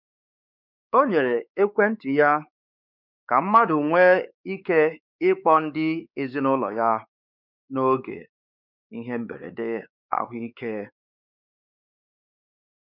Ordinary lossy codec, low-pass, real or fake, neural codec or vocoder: none; 5.4 kHz; fake; codec, 16 kHz, 4 kbps, X-Codec, WavLM features, trained on Multilingual LibriSpeech